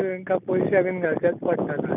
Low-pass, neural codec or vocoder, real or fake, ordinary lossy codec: 3.6 kHz; none; real; none